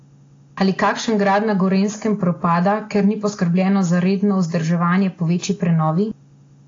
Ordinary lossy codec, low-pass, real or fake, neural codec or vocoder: AAC, 32 kbps; 7.2 kHz; real; none